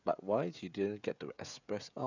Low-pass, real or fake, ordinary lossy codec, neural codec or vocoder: 7.2 kHz; fake; none; codec, 16 kHz, 16 kbps, FreqCodec, smaller model